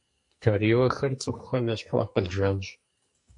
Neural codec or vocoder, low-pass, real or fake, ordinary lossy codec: codec, 44.1 kHz, 2.6 kbps, SNAC; 10.8 kHz; fake; MP3, 48 kbps